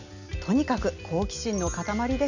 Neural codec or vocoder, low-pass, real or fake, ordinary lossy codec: none; 7.2 kHz; real; none